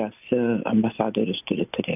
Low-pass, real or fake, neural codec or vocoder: 3.6 kHz; real; none